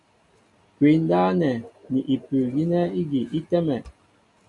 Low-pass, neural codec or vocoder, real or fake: 10.8 kHz; none; real